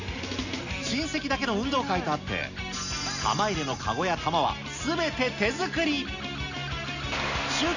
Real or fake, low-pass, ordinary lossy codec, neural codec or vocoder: real; 7.2 kHz; none; none